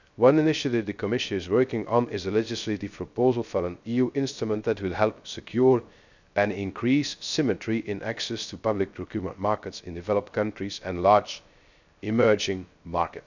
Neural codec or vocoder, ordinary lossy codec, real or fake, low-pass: codec, 16 kHz, 0.3 kbps, FocalCodec; none; fake; 7.2 kHz